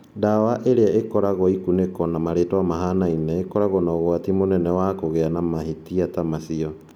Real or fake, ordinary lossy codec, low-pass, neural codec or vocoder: real; none; 19.8 kHz; none